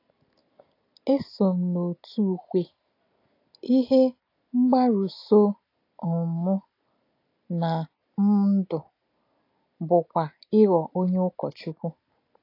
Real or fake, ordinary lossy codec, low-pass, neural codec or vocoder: real; AAC, 32 kbps; 5.4 kHz; none